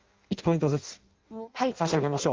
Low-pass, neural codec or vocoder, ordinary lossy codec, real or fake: 7.2 kHz; codec, 16 kHz in and 24 kHz out, 0.6 kbps, FireRedTTS-2 codec; Opus, 32 kbps; fake